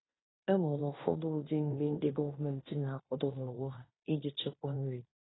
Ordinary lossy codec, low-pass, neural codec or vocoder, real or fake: AAC, 16 kbps; 7.2 kHz; codec, 16 kHz in and 24 kHz out, 0.9 kbps, LongCat-Audio-Codec, fine tuned four codebook decoder; fake